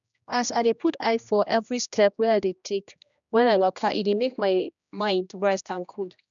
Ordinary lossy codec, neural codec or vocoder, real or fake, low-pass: none; codec, 16 kHz, 1 kbps, X-Codec, HuBERT features, trained on general audio; fake; 7.2 kHz